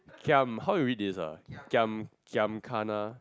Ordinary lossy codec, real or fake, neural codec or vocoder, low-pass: none; real; none; none